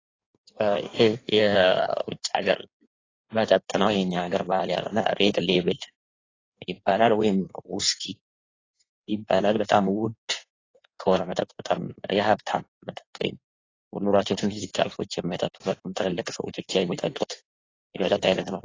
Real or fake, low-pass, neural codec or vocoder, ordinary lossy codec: fake; 7.2 kHz; codec, 16 kHz in and 24 kHz out, 1.1 kbps, FireRedTTS-2 codec; AAC, 32 kbps